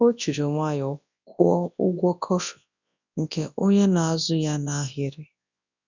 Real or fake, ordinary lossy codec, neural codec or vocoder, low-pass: fake; none; codec, 24 kHz, 0.9 kbps, WavTokenizer, large speech release; 7.2 kHz